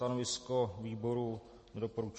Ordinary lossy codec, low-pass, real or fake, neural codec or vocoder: MP3, 32 kbps; 10.8 kHz; real; none